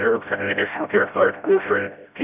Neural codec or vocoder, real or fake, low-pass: codec, 16 kHz, 0.5 kbps, FreqCodec, smaller model; fake; 3.6 kHz